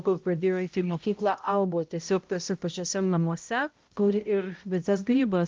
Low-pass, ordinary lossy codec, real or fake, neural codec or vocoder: 7.2 kHz; Opus, 24 kbps; fake; codec, 16 kHz, 0.5 kbps, X-Codec, HuBERT features, trained on balanced general audio